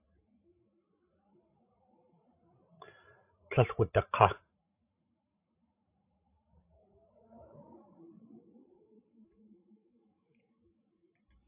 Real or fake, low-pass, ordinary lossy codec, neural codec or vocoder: fake; 3.6 kHz; AAC, 32 kbps; codec, 16 kHz, 16 kbps, FreqCodec, larger model